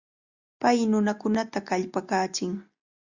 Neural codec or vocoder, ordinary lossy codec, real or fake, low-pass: none; Opus, 64 kbps; real; 7.2 kHz